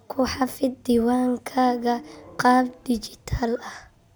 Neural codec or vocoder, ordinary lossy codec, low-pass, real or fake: none; none; none; real